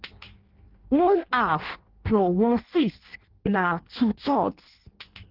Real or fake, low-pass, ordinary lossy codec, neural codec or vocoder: fake; 5.4 kHz; Opus, 16 kbps; codec, 16 kHz in and 24 kHz out, 0.6 kbps, FireRedTTS-2 codec